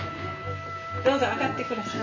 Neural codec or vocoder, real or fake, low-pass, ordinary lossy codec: none; real; 7.2 kHz; MP3, 64 kbps